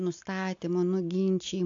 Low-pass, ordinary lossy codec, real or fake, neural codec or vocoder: 7.2 kHz; AAC, 48 kbps; real; none